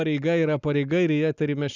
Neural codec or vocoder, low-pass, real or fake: none; 7.2 kHz; real